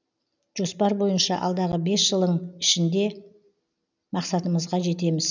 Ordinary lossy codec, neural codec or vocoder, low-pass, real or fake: none; none; 7.2 kHz; real